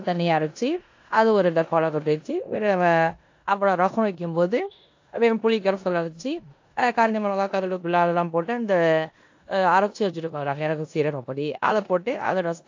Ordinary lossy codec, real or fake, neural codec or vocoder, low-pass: AAC, 48 kbps; fake; codec, 16 kHz in and 24 kHz out, 0.9 kbps, LongCat-Audio-Codec, four codebook decoder; 7.2 kHz